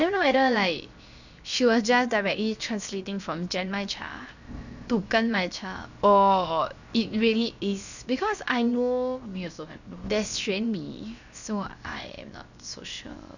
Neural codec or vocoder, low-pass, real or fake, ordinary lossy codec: codec, 16 kHz, 0.7 kbps, FocalCodec; 7.2 kHz; fake; none